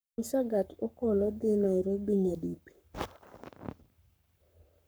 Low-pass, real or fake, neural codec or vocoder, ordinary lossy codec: none; fake; codec, 44.1 kHz, 7.8 kbps, Pupu-Codec; none